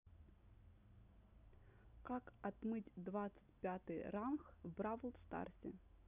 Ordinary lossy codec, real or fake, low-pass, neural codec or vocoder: none; real; 3.6 kHz; none